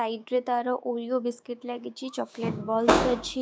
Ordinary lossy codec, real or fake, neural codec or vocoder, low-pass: none; fake; codec, 16 kHz, 6 kbps, DAC; none